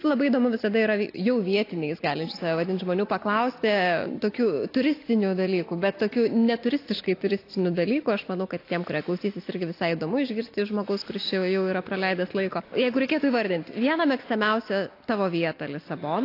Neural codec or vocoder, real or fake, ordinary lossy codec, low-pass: none; real; AAC, 32 kbps; 5.4 kHz